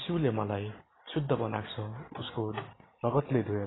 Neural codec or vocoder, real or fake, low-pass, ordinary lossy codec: none; real; 7.2 kHz; AAC, 16 kbps